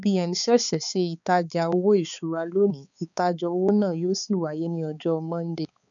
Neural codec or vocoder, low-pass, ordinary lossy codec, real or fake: codec, 16 kHz, 4 kbps, X-Codec, HuBERT features, trained on balanced general audio; 7.2 kHz; none; fake